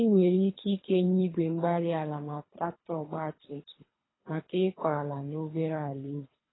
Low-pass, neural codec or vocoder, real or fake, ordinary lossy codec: 7.2 kHz; codec, 24 kHz, 3 kbps, HILCodec; fake; AAC, 16 kbps